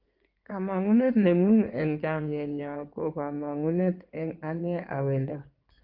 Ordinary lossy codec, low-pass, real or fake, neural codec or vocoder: Opus, 24 kbps; 5.4 kHz; fake; codec, 16 kHz in and 24 kHz out, 2.2 kbps, FireRedTTS-2 codec